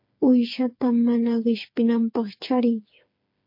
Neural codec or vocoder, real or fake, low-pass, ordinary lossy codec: codec, 16 kHz, 4 kbps, FreqCodec, smaller model; fake; 5.4 kHz; MP3, 32 kbps